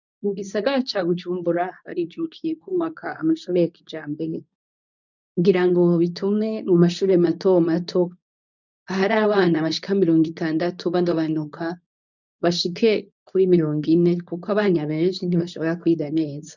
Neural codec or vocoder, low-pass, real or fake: codec, 24 kHz, 0.9 kbps, WavTokenizer, medium speech release version 2; 7.2 kHz; fake